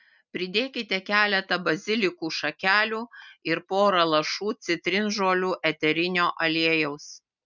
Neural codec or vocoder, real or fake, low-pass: none; real; 7.2 kHz